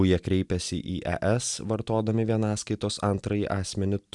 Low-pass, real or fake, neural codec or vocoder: 10.8 kHz; real; none